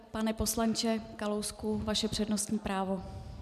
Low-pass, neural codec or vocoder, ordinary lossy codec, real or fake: 14.4 kHz; none; MP3, 96 kbps; real